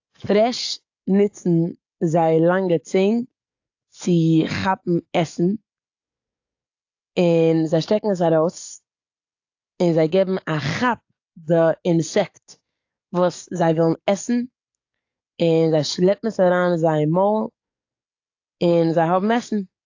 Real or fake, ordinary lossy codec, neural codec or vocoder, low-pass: fake; AAC, 48 kbps; codec, 44.1 kHz, 7.8 kbps, DAC; 7.2 kHz